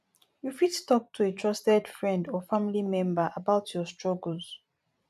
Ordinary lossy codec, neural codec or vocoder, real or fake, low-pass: none; vocoder, 44.1 kHz, 128 mel bands every 256 samples, BigVGAN v2; fake; 14.4 kHz